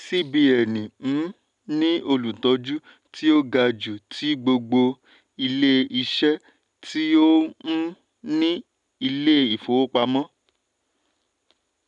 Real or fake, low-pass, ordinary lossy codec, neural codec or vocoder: real; 10.8 kHz; none; none